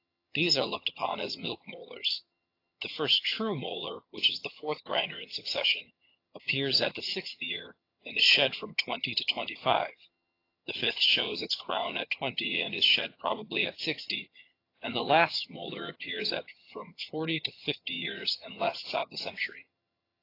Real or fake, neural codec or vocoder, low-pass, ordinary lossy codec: fake; vocoder, 22.05 kHz, 80 mel bands, HiFi-GAN; 5.4 kHz; AAC, 32 kbps